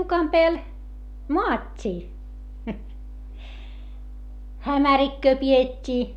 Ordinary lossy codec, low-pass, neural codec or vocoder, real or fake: none; 19.8 kHz; none; real